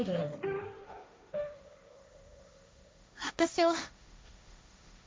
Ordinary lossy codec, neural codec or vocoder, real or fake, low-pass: none; codec, 16 kHz, 1.1 kbps, Voila-Tokenizer; fake; none